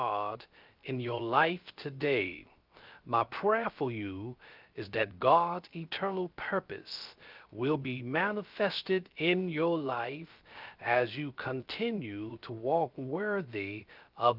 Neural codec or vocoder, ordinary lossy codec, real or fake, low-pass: codec, 16 kHz, 0.3 kbps, FocalCodec; Opus, 24 kbps; fake; 5.4 kHz